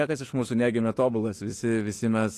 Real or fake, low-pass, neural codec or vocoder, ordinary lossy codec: fake; 14.4 kHz; autoencoder, 48 kHz, 32 numbers a frame, DAC-VAE, trained on Japanese speech; AAC, 48 kbps